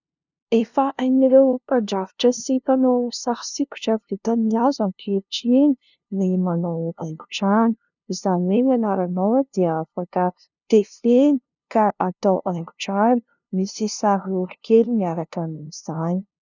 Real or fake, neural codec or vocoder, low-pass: fake; codec, 16 kHz, 0.5 kbps, FunCodec, trained on LibriTTS, 25 frames a second; 7.2 kHz